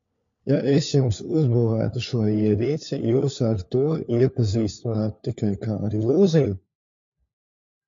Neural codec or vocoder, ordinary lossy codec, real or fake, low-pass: codec, 16 kHz, 4 kbps, FunCodec, trained on LibriTTS, 50 frames a second; MP3, 48 kbps; fake; 7.2 kHz